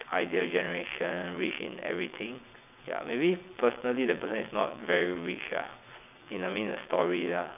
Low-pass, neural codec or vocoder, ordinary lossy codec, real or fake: 3.6 kHz; vocoder, 22.05 kHz, 80 mel bands, WaveNeXt; none; fake